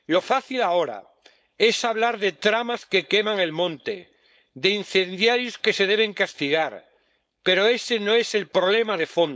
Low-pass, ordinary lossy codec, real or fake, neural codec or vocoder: none; none; fake; codec, 16 kHz, 4.8 kbps, FACodec